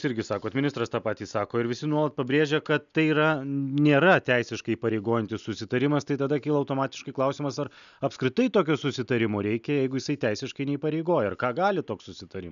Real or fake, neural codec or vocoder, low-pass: real; none; 7.2 kHz